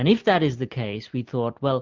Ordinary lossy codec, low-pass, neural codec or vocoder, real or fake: Opus, 24 kbps; 7.2 kHz; none; real